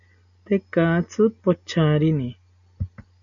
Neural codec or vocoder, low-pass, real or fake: none; 7.2 kHz; real